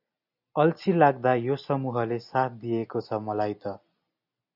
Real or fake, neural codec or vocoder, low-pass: real; none; 5.4 kHz